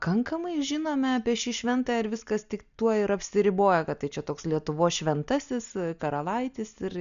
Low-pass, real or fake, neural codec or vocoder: 7.2 kHz; real; none